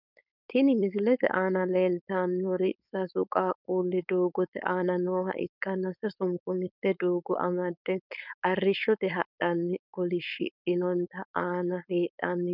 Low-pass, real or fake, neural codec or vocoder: 5.4 kHz; fake; codec, 16 kHz, 4.8 kbps, FACodec